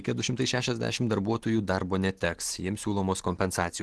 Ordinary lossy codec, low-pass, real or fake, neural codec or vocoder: Opus, 16 kbps; 10.8 kHz; real; none